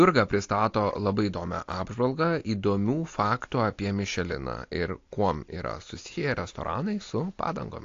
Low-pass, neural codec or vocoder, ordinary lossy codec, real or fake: 7.2 kHz; none; AAC, 48 kbps; real